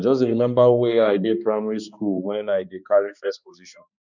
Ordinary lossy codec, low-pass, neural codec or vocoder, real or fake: none; 7.2 kHz; codec, 16 kHz, 2 kbps, X-Codec, HuBERT features, trained on balanced general audio; fake